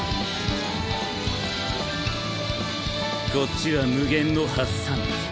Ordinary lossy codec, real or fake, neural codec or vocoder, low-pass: none; real; none; none